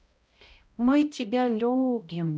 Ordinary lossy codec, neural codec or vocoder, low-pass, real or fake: none; codec, 16 kHz, 0.5 kbps, X-Codec, HuBERT features, trained on balanced general audio; none; fake